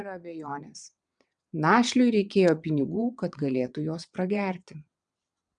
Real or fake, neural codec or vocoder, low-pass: fake; vocoder, 22.05 kHz, 80 mel bands, WaveNeXt; 9.9 kHz